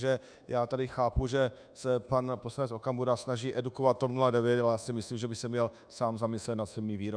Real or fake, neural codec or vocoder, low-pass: fake; codec, 24 kHz, 1.2 kbps, DualCodec; 9.9 kHz